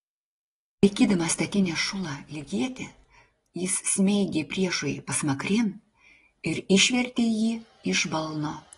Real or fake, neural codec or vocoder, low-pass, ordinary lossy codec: real; none; 10.8 kHz; AAC, 32 kbps